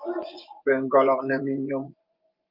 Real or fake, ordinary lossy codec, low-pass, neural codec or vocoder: fake; Opus, 32 kbps; 5.4 kHz; vocoder, 24 kHz, 100 mel bands, Vocos